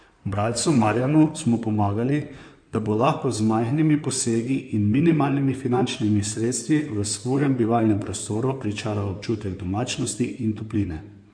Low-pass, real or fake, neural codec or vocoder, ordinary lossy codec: 9.9 kHz; fake; codec, 16 kHz in and 24 kHz out, 2.2 kbps, FireRedTTS-2 codec; none